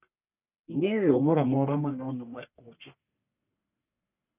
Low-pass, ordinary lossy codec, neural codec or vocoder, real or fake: 3.6 kHz; none; codec, 44.1 kHz, 1.7 kbps, Pupu-Codec; fake